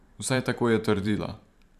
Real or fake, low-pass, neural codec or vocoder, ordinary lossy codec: fake; 14.4 kHz; vocoder, 44.1 kHz, 128 mel bands every 512 samples, BigVGAN v2; none